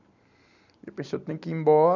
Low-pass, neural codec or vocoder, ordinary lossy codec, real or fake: 7.2 kHz; none; none; real